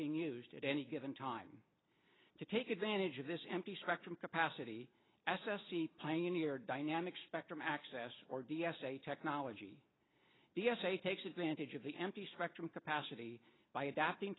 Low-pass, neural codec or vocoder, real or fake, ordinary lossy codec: 7.2 kHz; none; real; AAC, 16 kbps